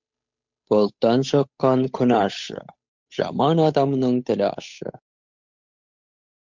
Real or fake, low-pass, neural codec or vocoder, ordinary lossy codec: fake; 7.2 kHz; codec, 16 kHz, 8 kbps, FunCodec, trained on Chinese and English, 25 frames a second; MP3, 64 kbps